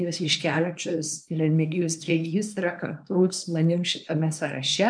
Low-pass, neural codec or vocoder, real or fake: 9.9 kHz; codec, 24 kHz, 0.9 kbps, WavTokenizer, small release; fake